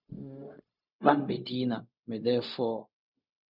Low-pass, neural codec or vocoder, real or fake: 5.4 kHz; codec, 16 kHz, 0.4 kbps, LongCat-Audio-Codec; fake